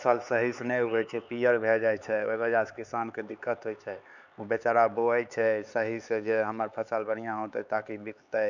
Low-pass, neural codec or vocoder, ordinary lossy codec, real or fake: 7.2 kHz; codec, 16 kHz, 4 kbps, X-Codec, HuBERT features, trained on LibriSpeech; none; fake